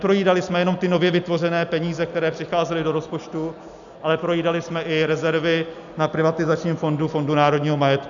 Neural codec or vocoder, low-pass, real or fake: none; 7.2 kHz; real